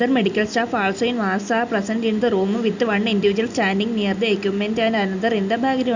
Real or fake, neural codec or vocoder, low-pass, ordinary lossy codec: real; none; 7.2 kHz; Opus, 64 kbps